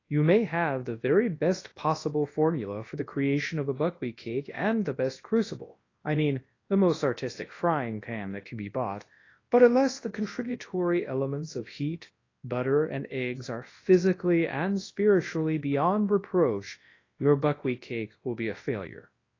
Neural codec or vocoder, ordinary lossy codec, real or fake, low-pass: codec, 24 kHz, 0.9 kbps, WavTokenizer, large speech release; AAC, 32 kbps; fake; 7.2 kHz